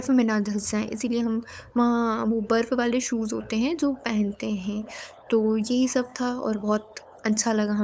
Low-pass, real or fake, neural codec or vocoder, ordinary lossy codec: none; fake; codec, 16 kHz, 8 kbps, FunCodec, trained on LibriTTS, 25 frames a second; none